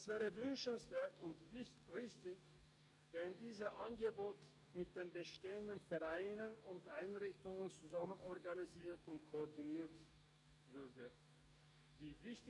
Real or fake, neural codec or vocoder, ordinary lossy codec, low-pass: fake; codec, 44.1 kHz, 2.6 kbps, DAC; none; 10.8 kHz